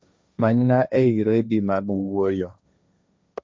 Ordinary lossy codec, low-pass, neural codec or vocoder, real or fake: none; none; codec, 16 kHz, 1.1 kbps, Voila-Tokenizer; fake